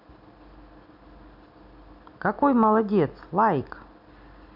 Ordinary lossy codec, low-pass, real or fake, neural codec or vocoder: none; 5.4 kHz; real; none